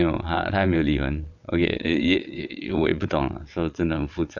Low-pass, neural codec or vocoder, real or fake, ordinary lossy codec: 7.2 kHz; vocoder, 22.05 kHz, 80 mel bands, WaveNeXt; fake; none